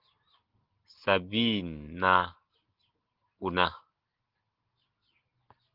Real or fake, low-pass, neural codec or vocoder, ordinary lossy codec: real; 5.4 kHz; none; Opus, 16 kbps